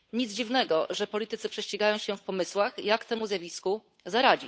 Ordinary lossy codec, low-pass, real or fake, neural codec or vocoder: none; none; fake; codec, 16 kHz, 8 kbps, FunCodec, trained on Chinese and English, 25 frames a second